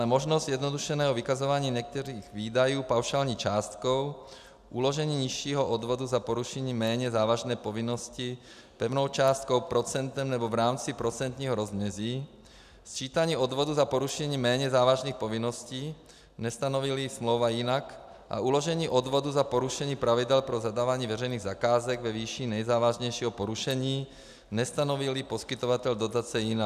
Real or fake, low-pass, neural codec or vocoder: real; 14.4 kHz; none